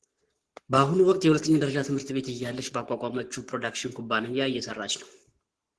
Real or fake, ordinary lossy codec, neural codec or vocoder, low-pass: fake; Opus, 16 kbps; codec, 44.1 kHz, 7.8 kbps, Pupu-Codec; 10.8 kHz